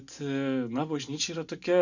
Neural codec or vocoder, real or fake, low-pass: none; real; 7.2 kHz